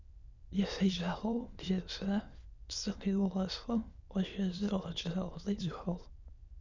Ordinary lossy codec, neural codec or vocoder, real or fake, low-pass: Opus, 64 kbps; autoencoder, 22.05 kHz, a latent of 192 numbers a frame, VITS, trained on many speakers; fake; 7.2 kHz